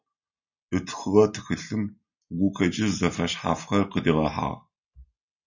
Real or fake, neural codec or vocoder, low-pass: real; none; 7.2 kHz